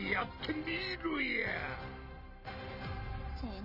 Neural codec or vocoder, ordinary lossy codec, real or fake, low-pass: none; none; real; 5.4 kHz